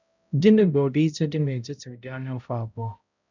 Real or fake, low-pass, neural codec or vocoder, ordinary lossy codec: fake; 7.2 kHz; codec, 16 kHz, 0.5 kbps, X-Codec, HuBERT features, trained on balanced general audio; none